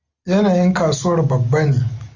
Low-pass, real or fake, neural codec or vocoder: 7.2 kHz; real; none